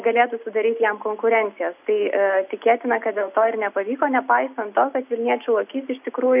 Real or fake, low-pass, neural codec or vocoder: real; 3.6 kHz; none